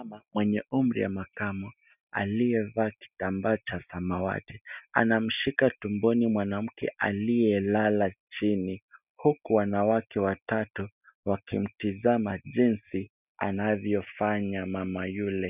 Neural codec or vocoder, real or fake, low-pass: none; real; 3.6 kHz